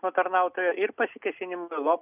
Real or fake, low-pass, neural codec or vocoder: real; 3.6 kHz; none